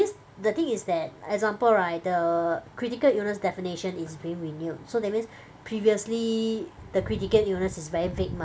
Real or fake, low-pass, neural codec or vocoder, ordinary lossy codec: real; none; none; none